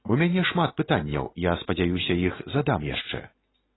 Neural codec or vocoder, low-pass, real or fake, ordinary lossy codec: none; 7.2 kHz; real; AAC, 16 kbps